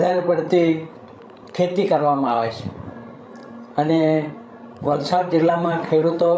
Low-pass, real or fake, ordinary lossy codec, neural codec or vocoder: none; fake; none; codec, 16 kHz, 16 kbps, FreqCodec, larger model